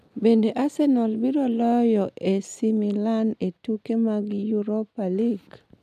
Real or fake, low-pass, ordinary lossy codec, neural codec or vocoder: real; 14.4 kHz; none; none